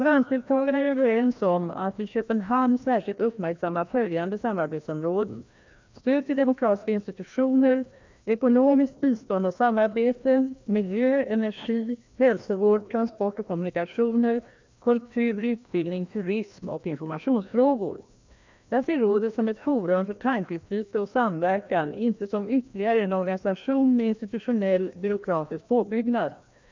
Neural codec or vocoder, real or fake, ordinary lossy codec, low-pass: codec, 16 kHz, 1 kbps, FreqCodec, larger model; fake; MP3, 64 kbps; 7.2 kHz